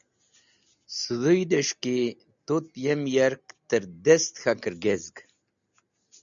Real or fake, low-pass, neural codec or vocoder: real; 7.2 kHz; none